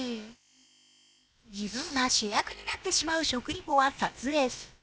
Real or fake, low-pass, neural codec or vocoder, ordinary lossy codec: fake; none; codec, 16 kHz, about 1 kbps, DyCAST, with the encoder's durations; none